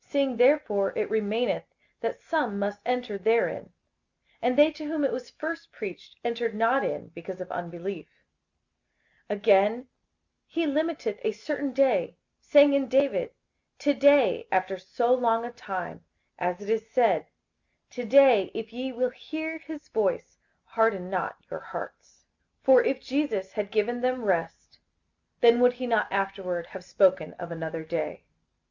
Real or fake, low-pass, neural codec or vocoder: real; 7.2 kHz; none